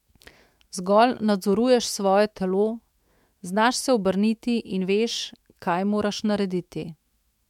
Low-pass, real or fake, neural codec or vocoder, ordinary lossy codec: 19.8 kHz; fake; autoencoder, 48 kHz, 128 numbers a frame, DAC-VAE, trained on Japanese speech; MP3, 96 kbps